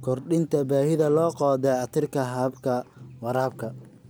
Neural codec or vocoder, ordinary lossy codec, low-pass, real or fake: none; none; none; real